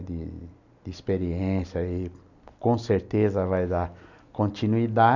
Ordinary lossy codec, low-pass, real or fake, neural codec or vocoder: none; 7.2 kHz; real; none